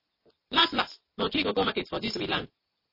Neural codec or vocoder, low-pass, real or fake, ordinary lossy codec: none; 5.4 kHz; real; MP3, 24 kbps